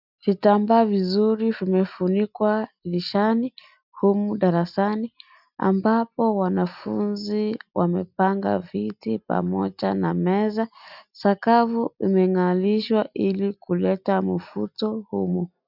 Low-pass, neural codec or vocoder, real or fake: 5.4 kHz; none; real